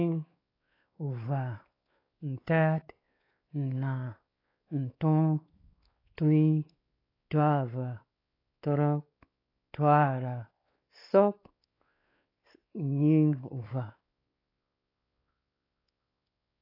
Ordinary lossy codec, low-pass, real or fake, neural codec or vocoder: AAC, 24 kbps; 5.4 kHz; fake; codec, 16 kHz, 4 kbps, X-Codec, WavLM features, trained on Multilingual LibriSpeech